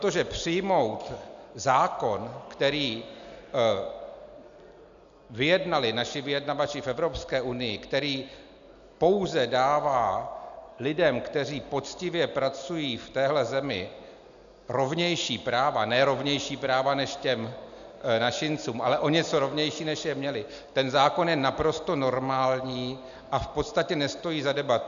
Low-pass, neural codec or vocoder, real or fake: 7.2 kHz; none; real